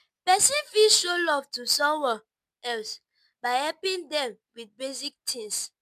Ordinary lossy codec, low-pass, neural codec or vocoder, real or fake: MP3, 96 kbps; 14.4 kHz; none; real